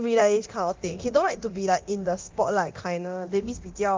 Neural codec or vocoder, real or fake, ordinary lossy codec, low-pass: codec, 24 kHz, 0.9 kbps, DualCodec; fake; Opus, 24 kbps; 7.2 kHz